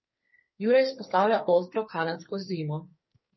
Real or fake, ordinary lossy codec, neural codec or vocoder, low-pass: fake; MP3, 24 kbps; codec, 44.1 kHz, 2.6 kbps, SNAC; 7.2 kHz